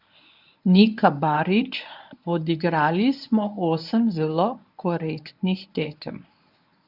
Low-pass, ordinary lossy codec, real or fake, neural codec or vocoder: 5.4 kHz; none; fake; codec, 24 kHz, 0.9 kbps, WavTokenizer, medium speech release version 1